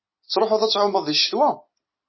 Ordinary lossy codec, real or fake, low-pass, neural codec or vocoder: MP3, 24 kbps; real; 7.2 kHz; none